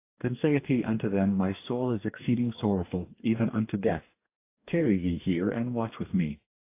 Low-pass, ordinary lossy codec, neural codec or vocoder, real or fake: 3.6 kHz; AAC, 24 kbps; codec, 44.1 kHz, 2.6 kbps, DAC; fake